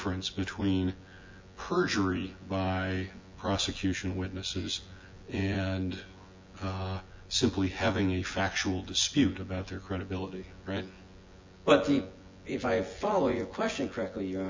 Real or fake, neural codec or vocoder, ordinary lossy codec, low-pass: fake; vocoder, 24 kHz, 100 mel bands, Vocos; MP3, 48 kbps; 7.2 kHz